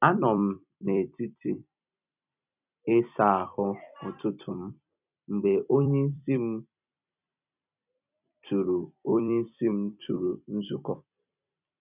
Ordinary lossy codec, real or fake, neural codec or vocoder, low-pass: none; fake; vocoder, 44.1 kHz, 128 mel bands every 256 samples, BigVGAN v2; 3.6 kHz